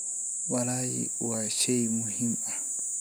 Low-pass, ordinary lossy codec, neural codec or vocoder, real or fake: none; none; none; real